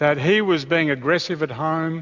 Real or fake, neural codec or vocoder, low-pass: real; none; 7.2 kHz